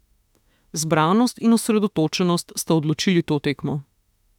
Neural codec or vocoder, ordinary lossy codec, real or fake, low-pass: autoencoder, 48 kHz, 32 numbers a frame, DAC-VAE, trained on Japanese speech; none; fake; 19.8 kHz